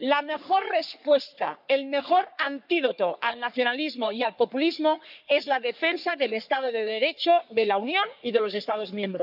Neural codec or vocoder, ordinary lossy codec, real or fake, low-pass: codec, 44.1 kHz, 3.4 kbps, Pupu-Codec; none; fake; 5.4 kHz